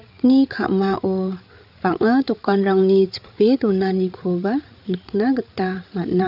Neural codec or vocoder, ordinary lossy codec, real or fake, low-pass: codec, 16 kHz, 8 kbps, FreqCodec, larger model; none; fake; 5.4 kHz